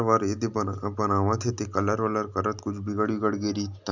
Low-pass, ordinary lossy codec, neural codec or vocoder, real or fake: 7.2 kHz; none; none; real